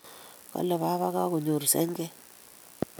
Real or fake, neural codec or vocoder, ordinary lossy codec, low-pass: real; none; none; none